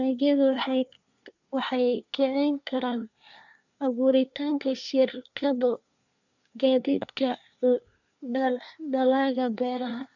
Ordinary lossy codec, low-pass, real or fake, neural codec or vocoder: none; 7.2 kHz; fake; codec, 24 kHz, 1 kbps, SNAC